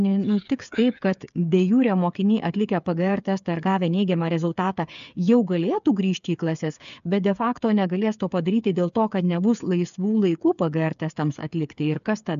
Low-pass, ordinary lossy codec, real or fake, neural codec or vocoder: 7.2 kHz; AAC, 96 kbps; fake; codec, 16 kHz, 8 kbps, FreqCodec, smaller model